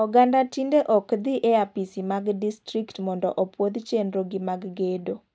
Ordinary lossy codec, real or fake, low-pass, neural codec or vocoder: none; real; none; none